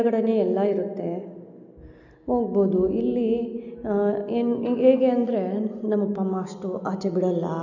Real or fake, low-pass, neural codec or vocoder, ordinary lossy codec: real; 7.2 kHz; none; none